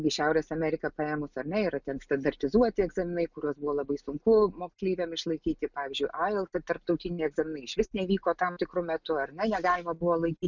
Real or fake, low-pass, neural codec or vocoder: real; 7.2 kHz; none